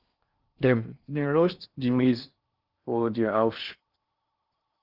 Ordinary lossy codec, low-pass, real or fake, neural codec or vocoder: Opus, 32 kbps; 5.4 kHz; fake; codec, 16 kHz in and 24 kHz out, 0.6 kbps, FocalCodec, streaming, 4096 codes